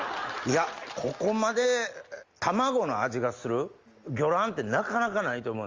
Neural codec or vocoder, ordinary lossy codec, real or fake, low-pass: none; Opus, 32 kbps; real; 7.2 kHz